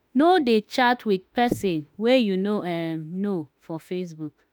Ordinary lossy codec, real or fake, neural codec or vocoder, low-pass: none; fake; autoencoder, 48 kHz, 32 numbers a frame, DAC-VAE, trained on Japanese speech; none